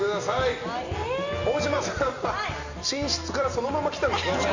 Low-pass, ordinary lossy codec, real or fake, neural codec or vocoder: 7.2 kHz; Opus, 64 kbps; real; none